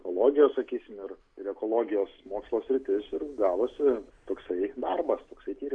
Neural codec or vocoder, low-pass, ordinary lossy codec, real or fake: none; 9.9 kHz; AAC, 64 kbps; real